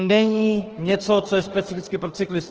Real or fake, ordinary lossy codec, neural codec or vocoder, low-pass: fake; Opus, 16 kbps; autoencoder, 48 kHz, 32 numbers a frame, DAC-VAE, trained on Japanese speech; 7.2 kHz